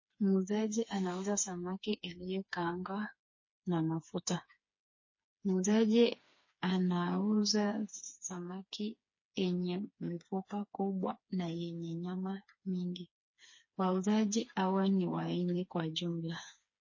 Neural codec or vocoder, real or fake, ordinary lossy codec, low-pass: codec, 16 kHz, 4 kbps, FreqCodec, smaller model; fake; MP3, 32 kbps; 7.2 kHz